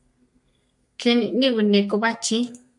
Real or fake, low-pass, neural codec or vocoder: fake; 10.8 kHz; codec, 32 kHz, 1.9 kbps, SNAC